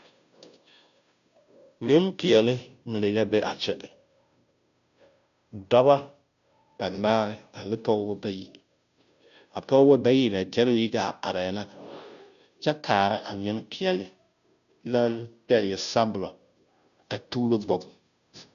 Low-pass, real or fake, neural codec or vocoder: 7.2 kHz; fake; codec, 16 kHz, 0.5 kbps, FunCodec, trained on Chinese and English, 25 frames a second